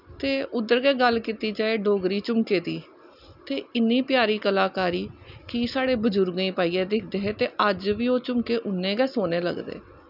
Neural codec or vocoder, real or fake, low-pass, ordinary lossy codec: none; real; 5.4 kHz; none